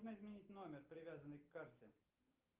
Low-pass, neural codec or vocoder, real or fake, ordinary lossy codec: 3.6 kHz; none; real; Opus, 32 kbps